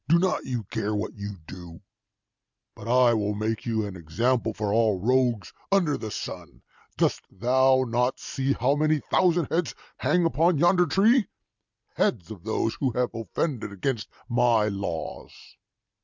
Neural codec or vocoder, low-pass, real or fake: none; 7.2 kHz; real